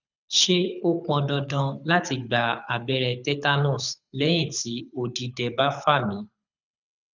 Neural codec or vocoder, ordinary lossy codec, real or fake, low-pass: codec, 24 kHz, 6 kbps, HILCodec; none; fake; 7.2 kHz